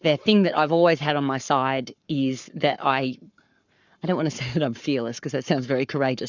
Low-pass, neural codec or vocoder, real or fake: 7.2 kHz; codec, 44.1 kHz, 7.8 kbps, Pupu-Codec; fake